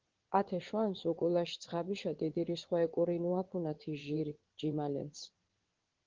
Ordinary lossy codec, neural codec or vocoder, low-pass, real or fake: Opus, 16 kbps; vocoder, 22.05 kHz, 80 mel bands, WaveNeXt; 7.2 kHz; fake